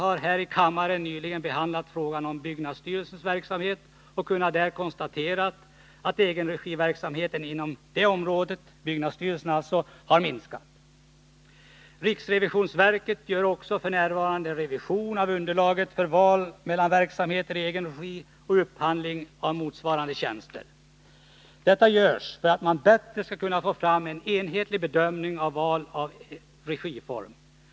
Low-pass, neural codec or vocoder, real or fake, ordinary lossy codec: none; none; real; none